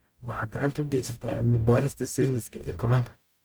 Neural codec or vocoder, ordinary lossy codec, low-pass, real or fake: codec, 44.1 kHz, 0.9 kbps, DAC; none; none; fake